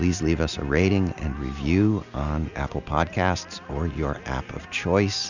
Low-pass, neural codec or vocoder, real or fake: 7.2 kHz; none; real